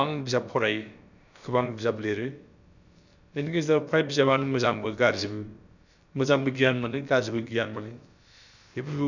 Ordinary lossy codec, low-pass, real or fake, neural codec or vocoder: none; 7.2 kHz; fake; codec, 16 kHz, about 1 kbps, DyCAST, with the encoder's durations